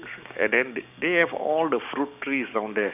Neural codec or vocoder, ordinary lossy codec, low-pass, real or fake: codec, 16 kHz, 6 kbps, DAC; none; 3.6 kHz; fake